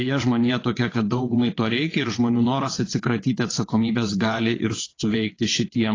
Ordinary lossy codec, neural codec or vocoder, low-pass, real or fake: AAC, 32 kbps; vocoder, 22.05 kHz, 80 mel bands, WaveNeXt; 7.2 kHz; fake